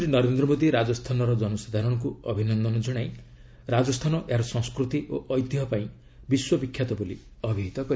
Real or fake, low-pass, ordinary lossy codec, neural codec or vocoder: real; none; none; none